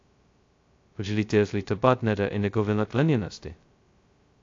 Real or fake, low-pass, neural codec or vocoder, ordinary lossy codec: fake; 7.2 kHz; codec, 16 kHz, 0.2 kbps, FocalCodec; AAC, 48 kbps